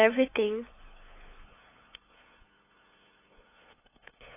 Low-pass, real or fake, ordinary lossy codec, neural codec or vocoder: 3.6 kHz; fake; AAC, 16 kbps; codec, 16 kHz, 8 kbps, FunCodec, trained on LibriTTS, 25 frames a second